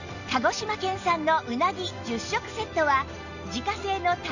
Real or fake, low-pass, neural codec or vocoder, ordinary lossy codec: real; 7.2 kHz; none; none